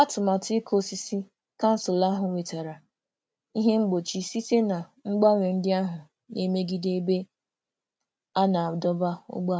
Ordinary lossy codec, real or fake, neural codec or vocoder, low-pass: none; real; none; none